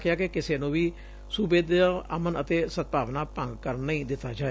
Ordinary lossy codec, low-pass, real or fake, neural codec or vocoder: none; none; real; none